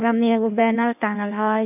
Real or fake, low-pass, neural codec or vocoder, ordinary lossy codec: fake; 3.6 kHz; codec, 16 kHz in and 24 kHz out, 1.1 kbps, FireRedTTS-2 codec; none